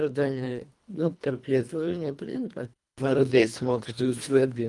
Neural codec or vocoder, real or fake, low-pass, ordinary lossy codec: codec, 24 kHz, 1.5 kbps, HILCodec; fake; 10.8 kHz; Opus, 32 kbps